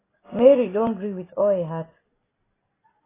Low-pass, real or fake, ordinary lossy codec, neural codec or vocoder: 3.6 kHz; real; AAC, 16 kbps; none